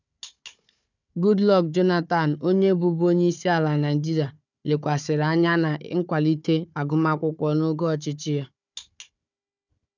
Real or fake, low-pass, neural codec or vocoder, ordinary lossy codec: fake; 7.2 kHz; codec, 16 kHz, 4 kbps, FunCodec, trained on Chinese and English, 50 frames a second; none